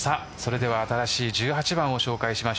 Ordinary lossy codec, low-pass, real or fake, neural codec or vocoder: none; none; real; none